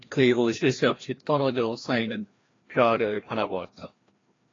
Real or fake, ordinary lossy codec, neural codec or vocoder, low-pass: fake; AAC, 32 kbps; codec, 16 kHz, 1 kbps, FreqCodec, larger model; 7.2 kHz